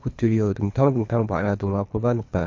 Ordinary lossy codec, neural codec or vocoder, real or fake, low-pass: MP3, 48 kbps; codec, 24 kHz, 3 kbps, HILCodec; fake; 7.2 kHz